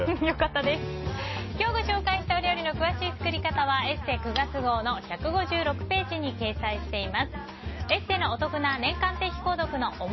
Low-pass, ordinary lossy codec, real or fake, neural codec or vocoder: 7.2 kHz; MP3, 24 kbps; real; none